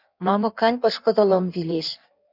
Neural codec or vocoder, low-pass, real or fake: codec, 16 kHz in and 24 kHz out, 1.1 kbps, FireRedTTS-2 codec; 5.4 kHz; fake